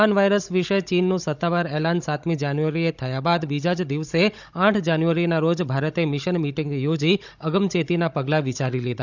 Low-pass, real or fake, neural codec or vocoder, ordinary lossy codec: 7.2 kHz; fake; codec, 16 kHz, 16 kbps, FunCodec, trained on Chinese and English, 50 frames a second; none